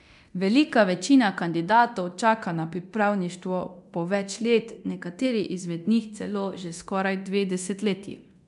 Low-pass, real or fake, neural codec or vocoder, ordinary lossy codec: 10.8 kHz; fake; codec, 24 kHz, 0.9 kbps, DualCodec; none